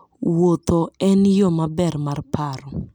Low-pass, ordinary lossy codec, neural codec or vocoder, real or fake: 19.8 kHz; none; none; real